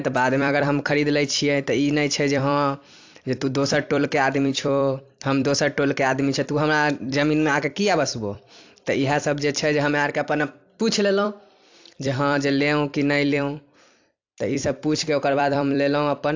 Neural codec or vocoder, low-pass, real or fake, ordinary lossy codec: vocoder, 44.1 kHz, 128 mel bands every 256 samples, BigVGAN v2; 7.2 kHz; fake; AAC, 48 kbps